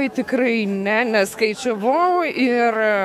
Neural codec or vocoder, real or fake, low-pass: autoencoder, 48 kHz, 128 numbers a frame, DAC-VAE, trained on Japanese speech; fake; 19.8 kHz